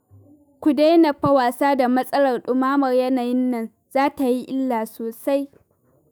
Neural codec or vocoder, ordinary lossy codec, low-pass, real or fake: autoencoder, 48 kHz, 128 numbers a frame, DAC-VAE, trained on Japanese speech; none; none; fake